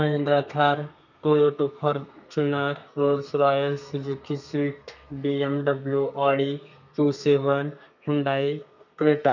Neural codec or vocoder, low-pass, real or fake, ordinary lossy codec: codec, 32 kHz, 1.9 kbps, SNAC; 7.2 kHz; fake; none